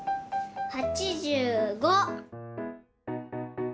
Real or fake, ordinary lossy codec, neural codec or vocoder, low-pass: real; none; none; none